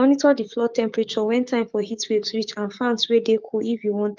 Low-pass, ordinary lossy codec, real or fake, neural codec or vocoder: 7.2 kHz; Opus, 32 kbps; fake; codec, 16 kHz, 6 kbps, DAC